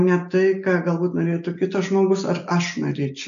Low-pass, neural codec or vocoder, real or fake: 7.2 kHz; none; real